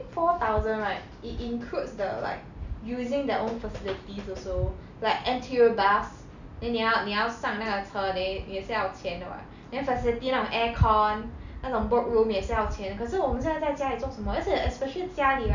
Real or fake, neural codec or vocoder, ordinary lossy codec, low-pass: real; none; none; 7.2 kHz